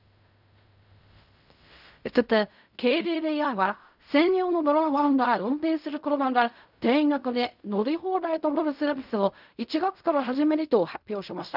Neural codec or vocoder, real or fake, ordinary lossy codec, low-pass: codec, 16 kHz in and 24 kHz out, 0.4 kbps, LongCat-Audio-Codec, fine tuned four codebook decoder; fake; none; 5.4 kHz